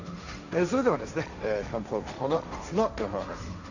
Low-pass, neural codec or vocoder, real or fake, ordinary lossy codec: 7.2 kHz; codec, 16 kHz, 1.1 kbps, Voila-Tokenizer; fake; none